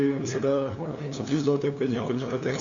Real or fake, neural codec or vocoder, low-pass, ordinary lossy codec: fake; codec, 16 kHz, 2 kbps, FunCodec, trained on LibriTTS, 25 frames a second; 7.2 kHz; MP3, 64 kbps